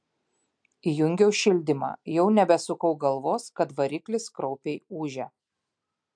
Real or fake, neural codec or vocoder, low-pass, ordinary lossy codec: real; none; 9.9 kHz; MP3, 64 kbps